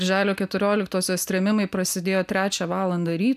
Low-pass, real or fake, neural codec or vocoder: 14.4 kHz; real; none